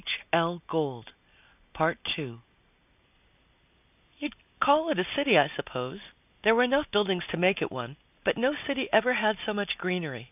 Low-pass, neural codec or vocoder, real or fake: 3.6 kHz; none; real